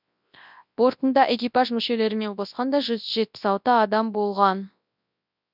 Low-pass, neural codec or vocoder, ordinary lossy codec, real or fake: 5.4 kHz; codec, 24 kHz, 0.9 kbps, WavTokenizer, large speech release; none; fake